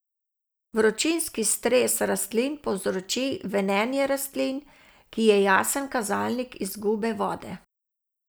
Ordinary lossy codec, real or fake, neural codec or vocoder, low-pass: none; real; none; none